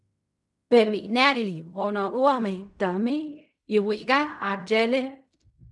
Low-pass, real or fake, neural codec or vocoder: 10.8 kHz; fake; codec, 16 kHz in and 24 kHz out, 0.4 kbps, LongCat-Audio-Codec, fine tuned four codebook decoder